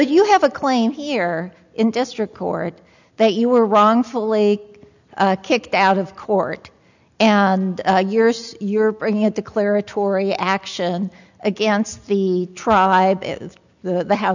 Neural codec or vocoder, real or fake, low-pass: none; real; 7.2 kHz